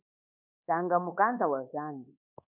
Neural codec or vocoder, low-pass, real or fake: codec, 24 kHz, 1.2 kbps, DualCodec; 3.6 kHz; fake